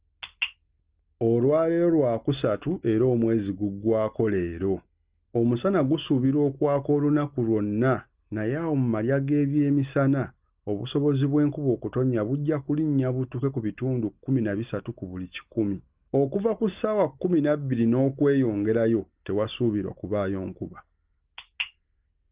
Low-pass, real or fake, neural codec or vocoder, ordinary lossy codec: 3.6 kHz; real; none; Opus, 32 kbps